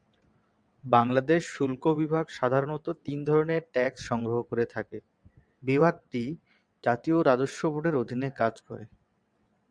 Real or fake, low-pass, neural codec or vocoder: fake; 9.9 kHz; vocoder, 22.05 kHz, 80 mel bands, WaveNeXt